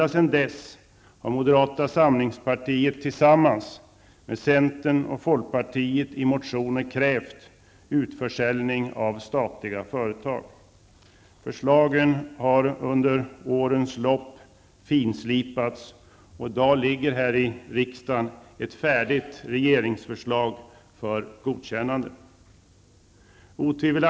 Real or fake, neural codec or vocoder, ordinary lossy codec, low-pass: real; none; none; none